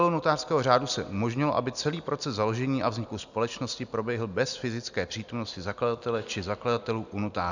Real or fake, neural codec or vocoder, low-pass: real; none; 7.2 kHz